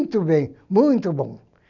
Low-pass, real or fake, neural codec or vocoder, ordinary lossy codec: 7.2 kHz; real; none; none